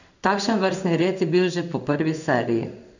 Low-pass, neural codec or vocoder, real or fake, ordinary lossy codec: 7.2 kHz; codec, 16 kHz in and 24 kHz out, 1 kbps, XY-Tokenizer; fake; none